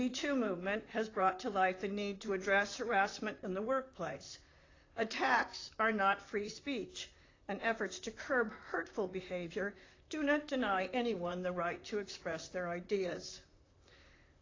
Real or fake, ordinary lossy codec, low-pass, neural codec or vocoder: fake; AAC, 32 kbps; 7.2 kHz; vocoder, 44.1 kHz, 128 mel bands, Pupu-Vocoder